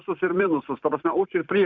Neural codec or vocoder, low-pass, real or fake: vocoder, 44.1 kHz, 128 mel bands every 256 samples, BigVGAN v2; 7.2 kHz; fake